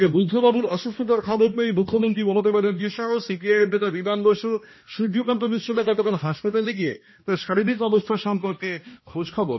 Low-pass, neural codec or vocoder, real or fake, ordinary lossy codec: 7.2 kHz; codec, 16 kHz, 1 kbps, X-Codec, HuBERT features, trained on balanced general audio; fake; MP3, 24 kbps